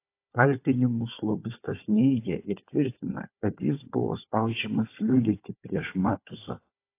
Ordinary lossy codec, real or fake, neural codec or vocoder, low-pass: AAC, 24 kbps; fake; codec, 16 kHz, 4 kbps, FunCodec, trained on Chinese and English, 50 frames a second; 3.6 kHz